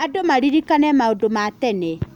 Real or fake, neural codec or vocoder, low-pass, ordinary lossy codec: real; none; 19.8 kHz; none